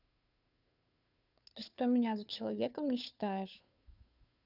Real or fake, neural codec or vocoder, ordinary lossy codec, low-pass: fake; codec, 16 kHz, 2 kbps, FunCodec, trained on Chinese and English, 25 frames a second; none; 5.4 kHz